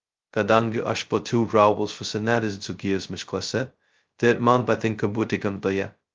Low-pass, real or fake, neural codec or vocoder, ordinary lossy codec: 7.2 kHz; fake; codec, 16 kHz, 0.2 kbps, FocalCodec; Opus, 32 kbps